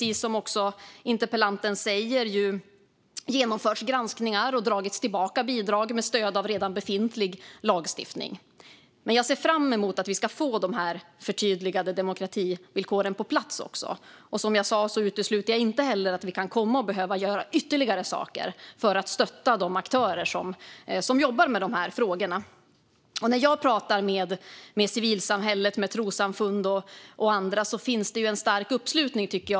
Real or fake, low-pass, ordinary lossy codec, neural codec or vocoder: real; none; none; none